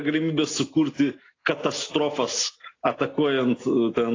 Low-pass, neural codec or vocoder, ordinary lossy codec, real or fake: 7.2 kHz; none; AAC, 32 kbps; real